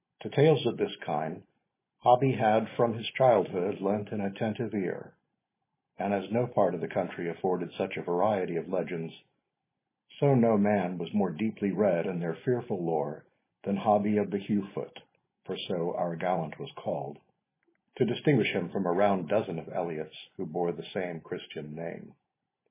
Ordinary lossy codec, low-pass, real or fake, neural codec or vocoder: MP3, 16 kbps; 3.6 kHz; real; none